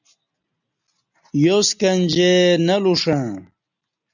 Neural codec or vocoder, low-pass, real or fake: none; 7.2 kHz; real